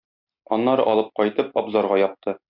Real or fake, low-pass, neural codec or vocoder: real; 5.4 kHz; none